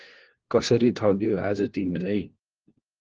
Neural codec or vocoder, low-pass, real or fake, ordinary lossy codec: codec, 16 kHz, 1 kbps, FunCodec, trained on LibriTTS, 50 frames a second; 7.2 kHz; fake; Opus, 16 kbps